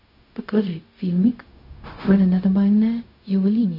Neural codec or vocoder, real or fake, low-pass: codec, 16 kHz, 0.4 kbps, LongCat-Audio-Codec; fake; 5.4 kHz